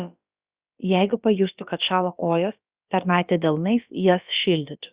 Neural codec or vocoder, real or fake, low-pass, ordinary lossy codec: codec, 16 kHz, about 1 kbps, DyCAST, with the encoder's durations; fake; 3.6 kHz; Opus, 64 kbps